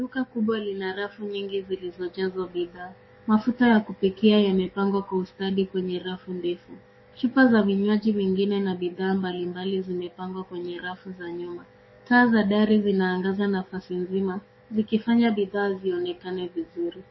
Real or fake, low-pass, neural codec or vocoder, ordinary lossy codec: fake; 7.2 kHz; codec, 44.1 kHz, 7.8 kbps, DAC; MP3, 24 kbps